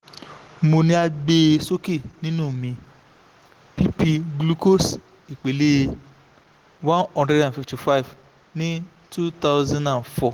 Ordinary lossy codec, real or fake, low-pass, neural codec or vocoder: Opus, 16 kbps; real; 19.8 kHz; none